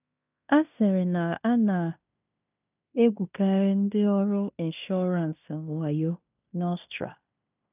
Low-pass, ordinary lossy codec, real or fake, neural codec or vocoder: 3.6 kHz; none; fake; codec, 16 kHz in and 24 kHz out, 0.9 kbps, LongCat-Audio-Codec, fine tuned four codebook decoder